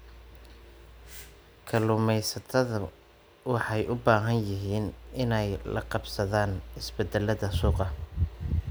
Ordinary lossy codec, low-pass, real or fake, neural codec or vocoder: none; none; real; none